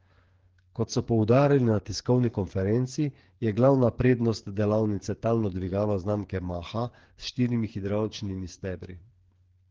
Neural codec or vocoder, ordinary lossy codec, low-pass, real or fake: codec, 16 kHz, 8 kbps, FreqCodec, smaller model; Opus, 16 kbps; 7.2 kHz; fake